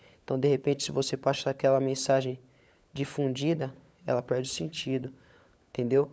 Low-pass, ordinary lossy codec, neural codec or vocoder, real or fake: none; none; codec, 16 kHz, 16 kbps, FunCodec, trained on LibriTTS, 50 frames a second; fake